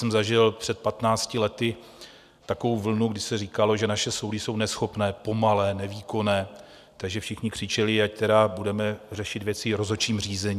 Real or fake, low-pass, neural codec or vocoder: real; 14.4 kHz; none